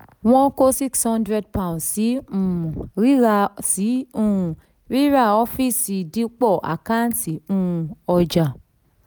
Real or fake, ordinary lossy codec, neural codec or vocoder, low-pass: real; none; none; none